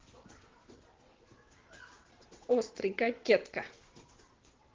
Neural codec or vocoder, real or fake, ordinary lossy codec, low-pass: vocoder, 22.05 kHz, 80 mel bands, WaveNeXt; fake; Opus, 16 kbps; 7.2 kHz